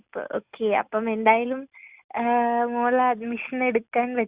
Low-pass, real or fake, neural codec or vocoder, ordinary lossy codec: 3.6 kHz; real; none; Opus, 64 kbps